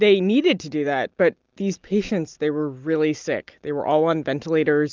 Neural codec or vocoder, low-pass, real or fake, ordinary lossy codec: none; 7.2 kHz; real; Opus, 24 kbps